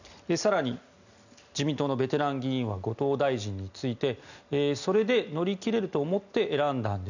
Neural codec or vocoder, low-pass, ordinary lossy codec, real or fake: none; 7.2 kHz; none; real